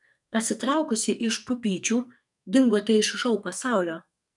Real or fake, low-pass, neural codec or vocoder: fake; 10.8 kHz; codec, 44.1 kHz, 2.6 kbps, SNAC